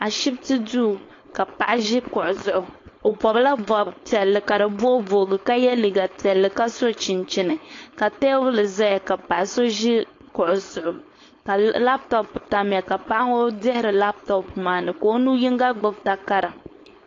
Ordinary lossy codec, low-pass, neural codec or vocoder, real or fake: AAC, 32 kbps; 7.2 kHz; codec, 16 kHz, 4.8 kbps, FACodec; fake